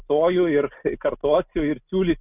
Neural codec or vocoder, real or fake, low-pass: none; real; 3.6 kHz